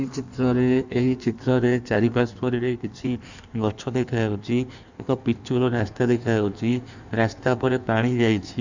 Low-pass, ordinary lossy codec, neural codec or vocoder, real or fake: 7.2 kHz; none; codec, 16 kHz in and 24 kHz out, 1.1 kbps, FireRedTTS-2 codec; fake